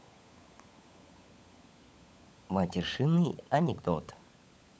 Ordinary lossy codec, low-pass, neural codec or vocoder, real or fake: none; none; codec, 16 kHz, 16 kbps, FunCodec, trained on LibriTTS, 50 frames a second; fake